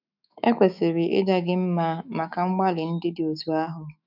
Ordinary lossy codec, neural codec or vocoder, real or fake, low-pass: none; autoencoder, 48 kHz, 128 numbers a frame, DAC-VAE, trained on Japanese speech; fake; 5.4 kHz